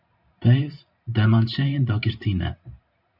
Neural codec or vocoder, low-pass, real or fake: vocoder, 44.1 kHz, 128 mel bands every 512 samples, BigVGAN v2; 5.4 kHz; fake